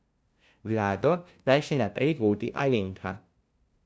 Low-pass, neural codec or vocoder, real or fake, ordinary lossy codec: none; codec, 16 kHz, 0.5 kbps, FunCodec, trained on LibriTTS, 25 frames a second; fake; none